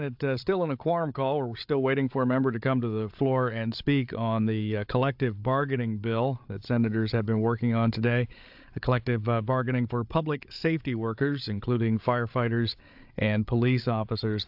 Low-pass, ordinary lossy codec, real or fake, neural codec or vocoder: 5.4 kHz; AAC, 48 kbps; fake; codec, 16 kHz, 8 kbps, FreqCodec, larger model